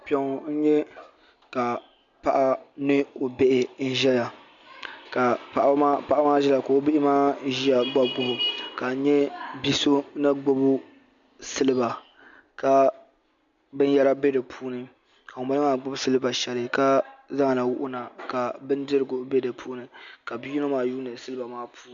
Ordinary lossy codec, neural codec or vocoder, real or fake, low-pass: MP3, 64 kbps; none; real; 7.2 kHz